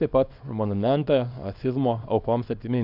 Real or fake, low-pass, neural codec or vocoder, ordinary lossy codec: fake; 5.4 kHz; codec, 24 kHz, 0.9 kbps, WavTokenizer, small release; Opus, 64 kbps